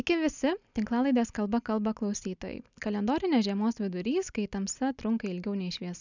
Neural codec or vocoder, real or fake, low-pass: none; real; 7.2 kHz